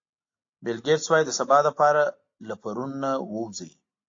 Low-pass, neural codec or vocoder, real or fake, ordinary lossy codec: 7.2 kHz; none; real; AAC, 48 kbps